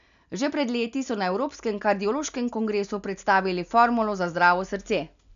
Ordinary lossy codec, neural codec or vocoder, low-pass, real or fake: none; none; 7.2 kHz; real